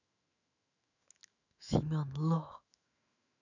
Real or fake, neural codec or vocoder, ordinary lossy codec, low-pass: fake; autoencoder, 48 kHz, 128 numbers a frame, DAC-VAE, trained on Japanese speech; none; 7.2 kHz